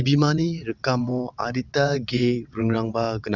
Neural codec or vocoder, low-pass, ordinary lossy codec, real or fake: vocoder, 22.05 kHz, 80 mel bands, WaveNeXt; 7.2 kHz; none; fake